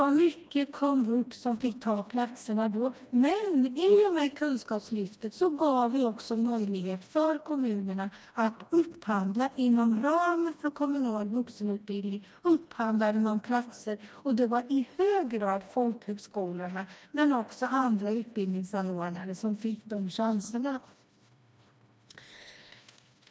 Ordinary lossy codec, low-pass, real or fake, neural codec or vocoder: none; none; fake; codec, 16 kHz, 1 kbps, FreqCodec, smaller model